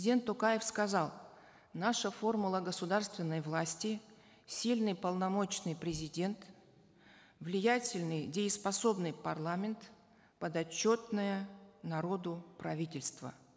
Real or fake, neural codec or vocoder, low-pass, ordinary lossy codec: real; none; none; none